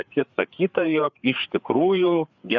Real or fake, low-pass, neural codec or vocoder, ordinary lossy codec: fake; 7.2 kHz; codec, 16 kHz, 4 kbps, FreqCodec, larger model; Opus, 64 kbps